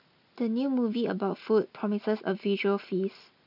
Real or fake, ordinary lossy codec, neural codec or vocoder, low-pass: real; none; none; 5.4 kHz